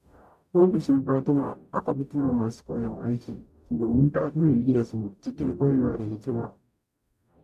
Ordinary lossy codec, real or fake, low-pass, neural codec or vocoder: none; fake; 14.4 kHz; codec, 44.1 kHz, 0.9 kbps, DAC